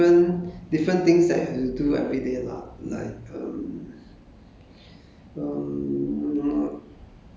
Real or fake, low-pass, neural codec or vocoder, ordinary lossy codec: real; none; none; none